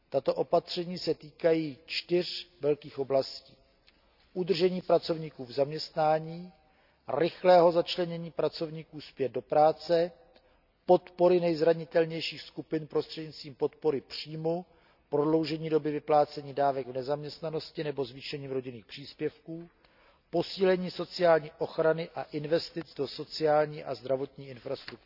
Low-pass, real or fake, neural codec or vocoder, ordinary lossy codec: 5.4 kHz; real; none; none